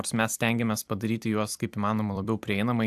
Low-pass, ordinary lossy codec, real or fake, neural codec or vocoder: 14.4 kHz; AAC, 96 kbps; fake; vocoder, 44.1 kHz, 128 mel bands every 256 samples, BigVGAN v2